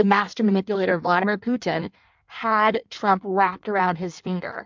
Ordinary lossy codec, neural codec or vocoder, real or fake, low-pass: MP3, 64 kbps; codec, 16 kHz in and 24 kHz out, 1.1 kbps, FireRedTTS-2 codec; fake; 7.2 kHz